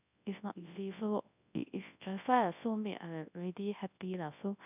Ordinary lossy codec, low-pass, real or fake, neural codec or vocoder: none; 3.6 kHz; fake; codec, 24 kHz, 0.9 kbps, WavTokenizer, large speech release